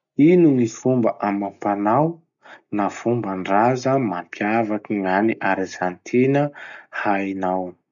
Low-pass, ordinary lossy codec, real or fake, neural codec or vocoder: 7.2 kHz; none; real; none